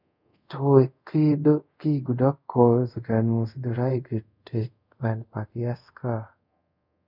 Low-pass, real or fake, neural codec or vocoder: 5.4 kHz; fake; codec, 24 kHz, 0.5 kbps, DualCodec